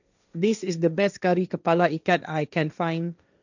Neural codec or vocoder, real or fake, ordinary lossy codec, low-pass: codec, 16 kHz, 1.1 kbps, Voila-Tokenizer; fake; none; 7.2 kHz